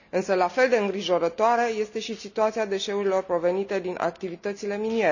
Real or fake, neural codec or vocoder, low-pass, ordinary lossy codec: real; none; 7.2 kHz; none